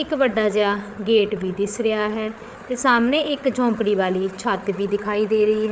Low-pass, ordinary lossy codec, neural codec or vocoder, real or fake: none; none; codec, 16 kHz, 8 kbps, FreqCodec, larger model; fake